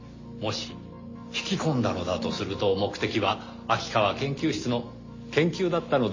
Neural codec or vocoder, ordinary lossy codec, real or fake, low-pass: none; MP3, 32 kbps; real; 7.2 kHz